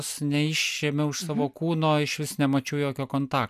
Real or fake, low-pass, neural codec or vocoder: real; 14.4 kHz; none